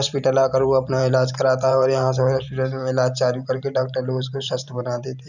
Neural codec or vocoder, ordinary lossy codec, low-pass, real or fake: vocoder, 44.1 kHz, 128 mel bands every 512 samples, BigVGAN v2; none; 7.2 kHz; fake